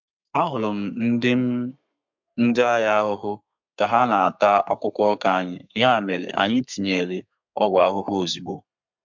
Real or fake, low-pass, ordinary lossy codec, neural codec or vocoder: fake; 7.2 kHz; MP3, 64 kbps; codec, 32 kHz, 1.9 kbps, SNAC